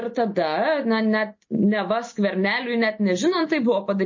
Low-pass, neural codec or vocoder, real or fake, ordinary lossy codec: 7.2 kHz; none; real; MP3, 32 kbps